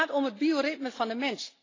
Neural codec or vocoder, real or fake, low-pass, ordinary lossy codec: none; real; 7.2 kHz; AAC, 32 kbps